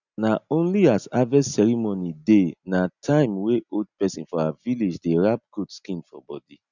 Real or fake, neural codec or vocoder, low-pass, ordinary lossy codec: real; none; 7.2 kHz; none